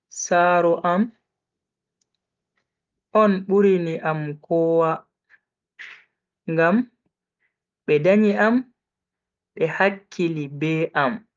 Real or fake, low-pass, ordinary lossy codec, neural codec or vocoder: real; 7.2 kHz; Opus, 32 kbps; none